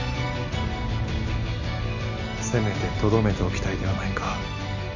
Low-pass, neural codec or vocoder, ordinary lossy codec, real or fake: 7.2 kHz; none; none; real